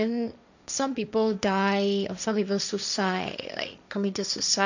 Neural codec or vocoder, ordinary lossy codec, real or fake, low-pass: codec, 16 kHz, 1.1 kbps, Voila-Tokenizer; none; fake; 7.2 kHz